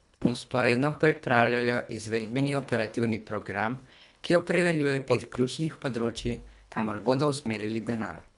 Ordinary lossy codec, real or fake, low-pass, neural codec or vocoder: none; fake; 10.8 kHz; codec, 24 kHz, 1.5 kbps, HILCodec